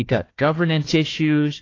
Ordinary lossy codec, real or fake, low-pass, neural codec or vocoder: AAC, 32 kbps; fake; 7.2 kHz; codec, 16 kHz, 1 kbps, FunCodec, trained on Chinese and English, 50 frames a second